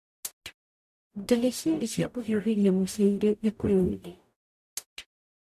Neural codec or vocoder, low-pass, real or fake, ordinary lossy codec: codec, 44.1 kHz, 0.9 kbps, DAC; 14.4 kHz; fake; none